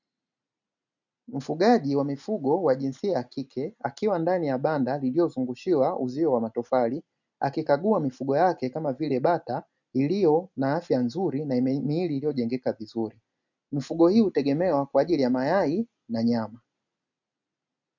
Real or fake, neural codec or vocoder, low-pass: real; none; 7.2 kHz